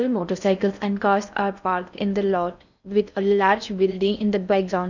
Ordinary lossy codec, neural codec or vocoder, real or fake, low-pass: none; codec, 16 kHz in and 24 kHz out, 0.6 kbps, FocalCodec, streaming, 4096 codes; fake; 7.2 kHz